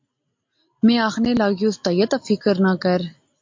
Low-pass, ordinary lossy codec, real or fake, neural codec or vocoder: 7.2 kHz; MP3, 48 kbps; real; none